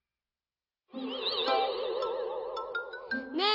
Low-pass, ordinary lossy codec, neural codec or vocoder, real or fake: 5.4 kHz; none; none; real